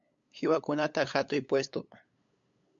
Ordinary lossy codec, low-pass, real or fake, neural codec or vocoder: MP3, 64 kbps; 7.2 kHz; fake; codec, 16 kHz, 8 kbps, FunCodec, trained on LibriTTS, 25 frames a second